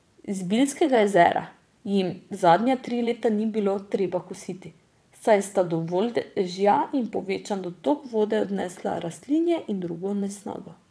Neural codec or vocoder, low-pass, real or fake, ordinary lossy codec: vocoder, 22.05 kHz, 80 mel bands, WaveNeXt; none; fake; none